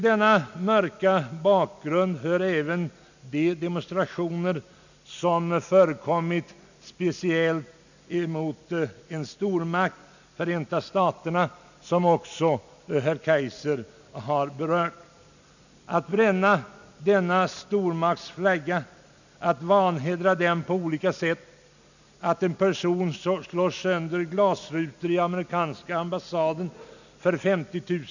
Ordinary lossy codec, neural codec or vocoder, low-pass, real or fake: none; none; 7.2 kHz; real